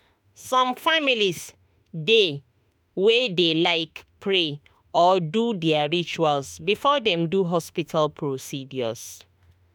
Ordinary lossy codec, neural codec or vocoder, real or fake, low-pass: none; autoencoder, 48 kHz, 32 numbers a frame, DAC-VAE, trained on Japanese speech; fake; none